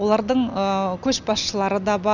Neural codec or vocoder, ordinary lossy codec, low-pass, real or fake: none; none; 7.2 kHz; real